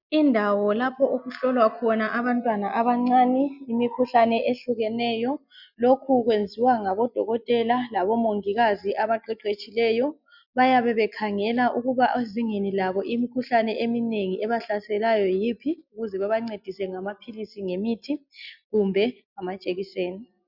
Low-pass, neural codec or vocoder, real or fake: 5.4 kHz; none; real